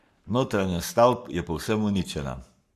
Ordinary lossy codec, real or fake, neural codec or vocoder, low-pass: Opus, 64 kbps; fake; codec, 44.1 kHz, 7.8 kbps, Pupu-Codec; 14.4 kHz